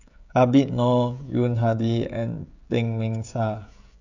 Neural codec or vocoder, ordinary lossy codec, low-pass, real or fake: codec, 16 kHz, 16 kbps, FreqCodec, smaller model; none; 7.2 kHz; fake